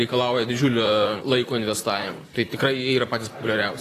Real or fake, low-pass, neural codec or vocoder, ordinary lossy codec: fake; 14.4 kHz; vocoder, 44.1 kHz, 128 mel bands, Pupu-Vocoder; AAC, 48 kbps